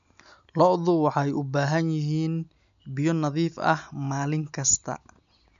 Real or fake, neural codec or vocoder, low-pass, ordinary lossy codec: real; none; 7.2 kHz; none